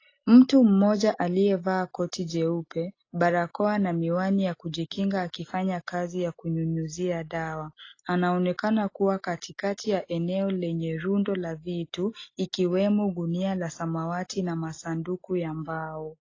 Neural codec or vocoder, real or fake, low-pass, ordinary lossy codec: none; real; 7.2 kHz; AAC, 32 kbps